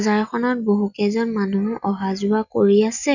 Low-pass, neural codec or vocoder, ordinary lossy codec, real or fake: 7.2 kHz; none; none; real